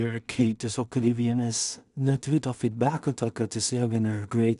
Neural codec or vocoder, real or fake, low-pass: codec, 16 kHz in and 24 kHz out, 0.4 kbps, LongCat-Audio-Codec, two codebook decoder; fake; 10.8 kHz